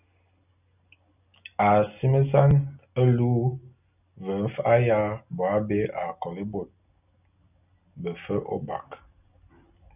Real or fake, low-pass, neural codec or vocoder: real; 3.6 kHz; none